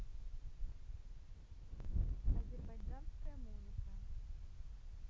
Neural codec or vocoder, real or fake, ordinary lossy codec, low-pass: none; real; none; none